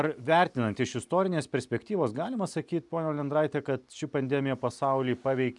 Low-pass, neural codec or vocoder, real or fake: 10.8 kHz; none; real